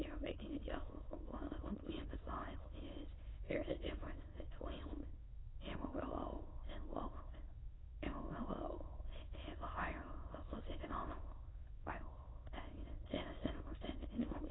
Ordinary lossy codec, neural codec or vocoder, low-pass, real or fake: AAC, 16 kbps; autoencoder, 22.05 kHz, a latent of 192 numbers a frame, VITS, trained on many speakers; 7.2 kHz; fake